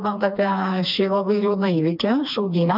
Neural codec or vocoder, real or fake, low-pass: codec, 16 kHz, 2 kbps, FreqCodec, smaller model; fake; 5.4 kHz